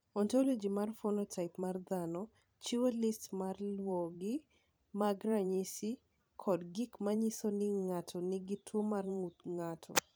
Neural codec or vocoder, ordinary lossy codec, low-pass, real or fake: vocoder, 44.1 kHz, 128 mel bands every 512 samples, BigVGAN v2; none; none; fake